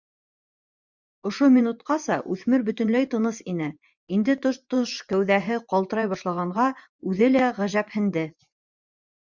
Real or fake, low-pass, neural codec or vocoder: fake; 7.2 kHz; vocoder, 24 kHz, 100 mel bands, Vocos